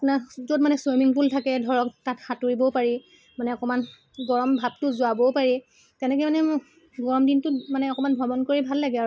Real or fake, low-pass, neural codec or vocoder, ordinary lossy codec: real; none; none; none